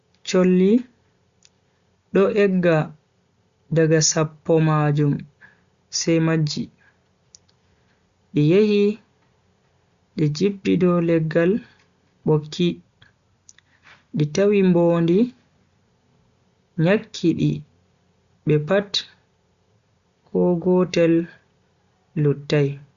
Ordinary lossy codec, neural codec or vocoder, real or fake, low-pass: Opus, 64 kbps; none; real; 7.2 kHz